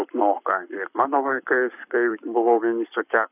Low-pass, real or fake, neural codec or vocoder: 3.6 kHz; fake; codec, 44.1 kHz, 7.8 kbps, Pupu-Codec